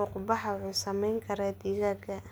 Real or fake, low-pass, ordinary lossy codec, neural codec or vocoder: real; none; none; none